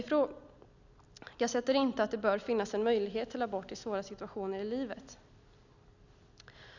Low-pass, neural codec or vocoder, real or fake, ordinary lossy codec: 7.2 kHz; none; real; none